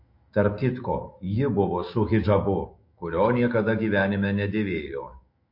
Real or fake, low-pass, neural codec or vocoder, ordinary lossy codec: fake; 5.4 kHz; autoencoder, 48 kHz, 128 numbers a frame, DAC-VAE, trained on Japanese speech; MP3, 32 kbps